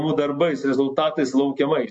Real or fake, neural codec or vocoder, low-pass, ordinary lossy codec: real; none; 10.8 kHz; MP3, 48 kbps